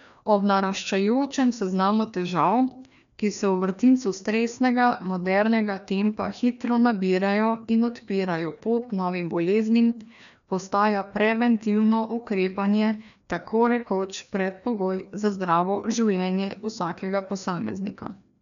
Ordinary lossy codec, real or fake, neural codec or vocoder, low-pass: none; fake; codec, 16 kHz, 1 kbps, FreqCodec, larger model; 7.2 kHz